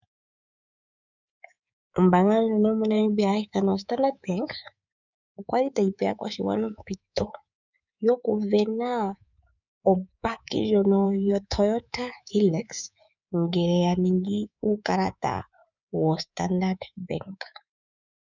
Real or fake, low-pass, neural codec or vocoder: fake; 7.2 kHz; codec, 24 kHz, 3.1 kbps, DualCodec